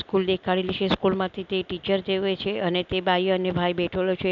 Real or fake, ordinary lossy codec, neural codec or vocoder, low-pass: real; none; none; 7.2 kHz